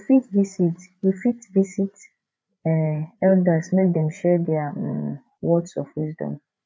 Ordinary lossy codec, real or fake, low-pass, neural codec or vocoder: none; fake; none; codec, 16 kHz, 8 kbps, FreqCodec, larger model